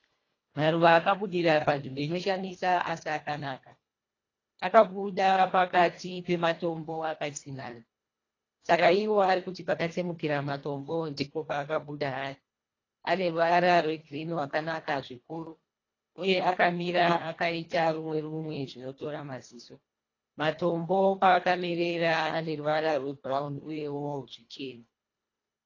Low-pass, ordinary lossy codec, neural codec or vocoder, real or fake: 7.2 kHz; AAC, 32 kbps; codec, 24 kHz, 1.5 kbps, HILCodec; fake